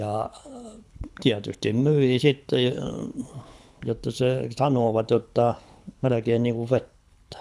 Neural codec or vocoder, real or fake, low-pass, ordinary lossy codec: codec, 24 kHz, 6 kbps, HILCodec; fake; none; none